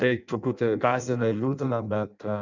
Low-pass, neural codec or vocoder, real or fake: 7.2 kHz; codec, 16 kHz in and 24 kHz out, 0.6 kbps, FireRedTTS-2 codec; fake